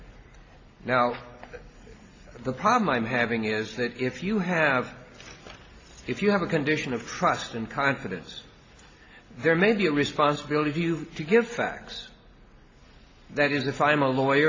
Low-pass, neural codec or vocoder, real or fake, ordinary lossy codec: 7.2 kHz; none; real; AAC, 32 kbps